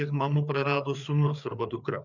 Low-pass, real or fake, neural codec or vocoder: 7.2 kHz; fake; codec, 16 kHz, 4 kbps, FreqCodec, larger model